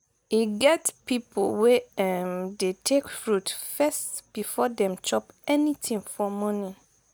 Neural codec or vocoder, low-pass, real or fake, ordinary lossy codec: none; none; real; none